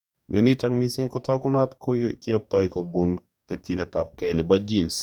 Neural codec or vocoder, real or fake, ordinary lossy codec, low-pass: codec, 44.1 kHz, 2.6 kbps, DAC; fake; none; 19.8 kHz